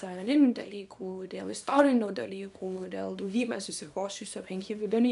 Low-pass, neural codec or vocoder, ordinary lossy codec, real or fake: 10.8 kHz; codec, 24 kHz, 0.9 kbps, WavTokenizer, small release; AAC, 96 kbps; fake